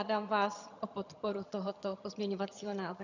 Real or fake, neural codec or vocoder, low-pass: fake; vocoder, 22.05 kHz, 80 mel bands, HiFi-GAN; 7.2 kHz